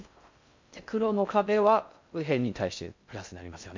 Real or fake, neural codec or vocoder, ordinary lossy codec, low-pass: fake; codec, 16 kHz in and 24 kHz out, 0.6 kbps, FocalCodec, streaming, 4096 codes; MP3, 64 kbps; 7.2 kHz